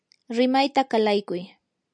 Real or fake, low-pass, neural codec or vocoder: real; 9.9 kHz; none